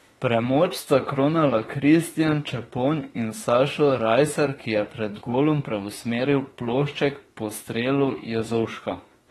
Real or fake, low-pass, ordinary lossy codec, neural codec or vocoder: fake; 19.8 kHz; AAC, 32 kbps; autoencoder, 48 kHz, 32 numbers a frame, DAC-VAE, trained on Japanese speech